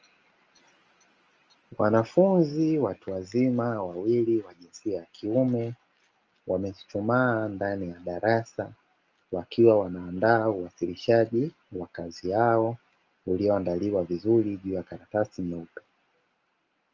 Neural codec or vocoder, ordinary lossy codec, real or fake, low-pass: none; Opus, 24 kbps; real; 7.2 kHz